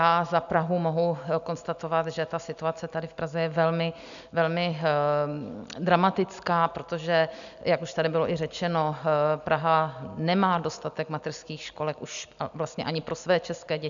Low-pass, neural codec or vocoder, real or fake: 7.2 kHz; none; real